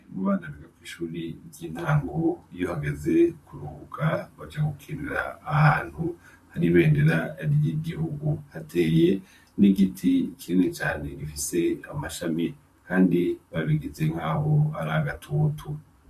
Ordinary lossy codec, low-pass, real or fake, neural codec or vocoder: MP3, 64 kbps; 14.4 kHz; fake; vocoder, 44.1 kHz, 128 mel bands, Pupu-Vocoder